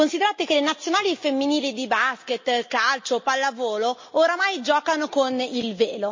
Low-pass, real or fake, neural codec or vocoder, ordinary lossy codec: 7.2 kHz; real; none; MP3, 32 kbps